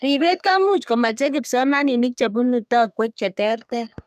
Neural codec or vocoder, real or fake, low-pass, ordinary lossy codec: codec, 32 kHz, 1.9 kbps, SNAC; fake; 14.4 kHz; none